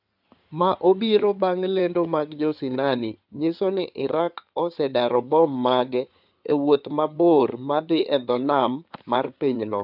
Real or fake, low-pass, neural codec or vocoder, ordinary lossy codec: fake; 5.4 kHz; codec, 16 kHz in and 24 kHz out, 2.2 kbps, FireRedTTS-2 codec; none